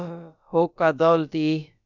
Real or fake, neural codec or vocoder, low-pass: fake; codec, 16 kHz, about 1 kbps, DyCAST, with the encoder's durations; 7.2 kHz